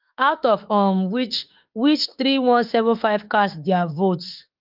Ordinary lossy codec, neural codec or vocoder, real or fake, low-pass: Opus, 32 kbps; autoencoder, 48 kHz, 32 numbers a frame, DAC-VAE, trained on Japanese speech; fake; 5.4 kHz